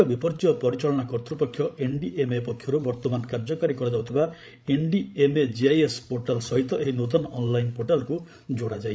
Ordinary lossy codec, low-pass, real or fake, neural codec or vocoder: none; none; fake; codec, 16 kHz, 16 kbps, FreqCodec, larger model